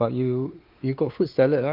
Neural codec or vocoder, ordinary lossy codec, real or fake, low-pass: codec, 16 kHz, 4 kbps, X-Codec, WavLM features, trained on Multilingual LibriSpeech; Opus, 32 kbps; fake; 5.4 kHz